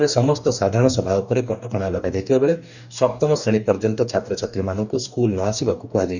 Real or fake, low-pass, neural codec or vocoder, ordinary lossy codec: fake; 7.2 kHz; codec, 44.1 kHz, 2.6 kbps, DAC; none